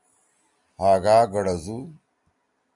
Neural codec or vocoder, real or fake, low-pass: none; real; 10.8 kHz